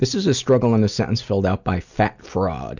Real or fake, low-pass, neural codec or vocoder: real; 7.2 kHz; none